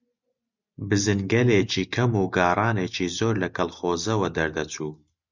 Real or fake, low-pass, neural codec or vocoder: real; 7.2 kHz; none